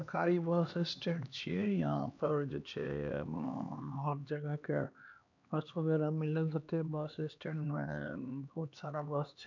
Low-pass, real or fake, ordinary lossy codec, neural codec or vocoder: 7.2 kHz; fake; none; codec, 16 kHz, 2 kbps, X-Codec, HuBERT features, trained on LibriSpeech